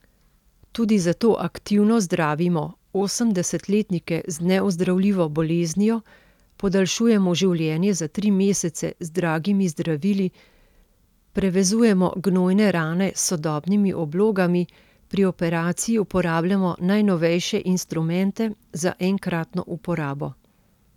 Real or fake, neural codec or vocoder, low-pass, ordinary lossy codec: real; none; 19.8 kHz; none